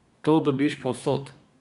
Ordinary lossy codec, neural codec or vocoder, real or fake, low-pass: none; codec, 24 kHz, 1 kbps, SNAC; fake; 10.8 kHz